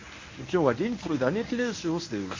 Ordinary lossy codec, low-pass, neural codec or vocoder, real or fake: MP3, 32 kbps; 7.2 kHz; codec, 24 kHz, 0.9 kbps, WavTokenizer, medium speech release version 1; fake